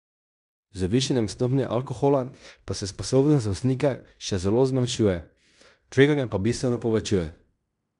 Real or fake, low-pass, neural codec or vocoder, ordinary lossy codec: fake; 10.8 kHz; codec, 16 kHz in and 24 kHz out, 0.9 kbps, LongCat-Audio-Codec, fine tuned four codebook decoder; none